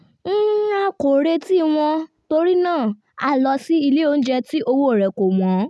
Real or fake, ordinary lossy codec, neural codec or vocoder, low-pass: real; none; none; none